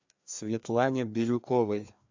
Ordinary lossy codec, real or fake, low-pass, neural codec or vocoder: MP3, 64 kbps; fake; 7.2 kHz; codec, 16 kHz, 2 kbps, FreqCodec, larger model